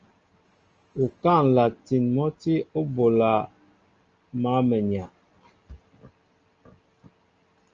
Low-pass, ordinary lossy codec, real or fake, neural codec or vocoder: 7.2 kHz; Opus, 24 kbps; real; none